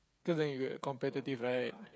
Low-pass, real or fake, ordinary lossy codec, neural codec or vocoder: none; fake; none; codec, 16 kHz, 8 kbps, FreqCodec, smaller model